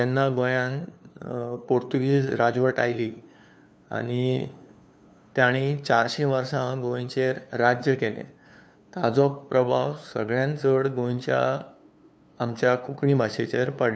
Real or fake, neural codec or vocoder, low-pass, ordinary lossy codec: fake; codec, 16 kHz, 2 kbps, FunCodec, trained on LibriTTS, 25 frames a second; none; none